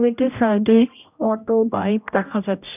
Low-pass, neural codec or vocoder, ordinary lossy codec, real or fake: 3.6 kHz; codec, 16 kHz, 0.5 kbps, X-Codec, HuBERT features, trained on general audio; none; fake